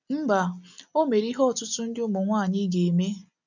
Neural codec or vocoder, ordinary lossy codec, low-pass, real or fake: none; none; 7.2 kHz; real